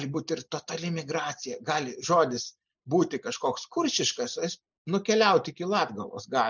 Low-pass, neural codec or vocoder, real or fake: 7.2 kHz; none; real